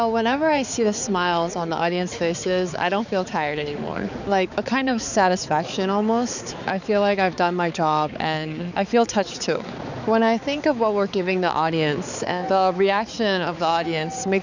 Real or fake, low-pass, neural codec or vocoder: fake; 7.2 kHz; codec, 16 kHz, 4 kbps, X-Codec, HuBERT features, trained on balanced general audio